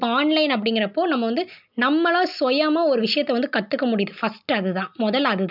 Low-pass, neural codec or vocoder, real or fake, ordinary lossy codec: 5.4 kHz; none; real; none